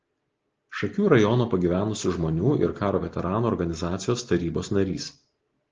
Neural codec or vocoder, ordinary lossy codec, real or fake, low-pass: none; Opus, 16 kbps; real; 7.2 kHz